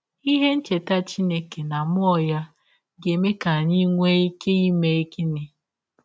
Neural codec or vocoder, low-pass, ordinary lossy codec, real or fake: none; none; none; real